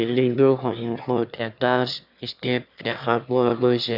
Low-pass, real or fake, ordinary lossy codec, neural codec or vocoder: 5.4 kHz; fake; none; autoencoder, 22.05 kHz, a latent of 192 numbers a frame, VITS, trained on one speaker